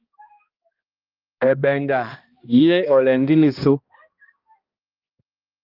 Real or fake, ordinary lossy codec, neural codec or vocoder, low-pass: fake; Opus, 32 kbps; codec, 16 kHz, 1 kbps, X-Codec, HuBERT features, trained on balanced general audio; 5.4 kHz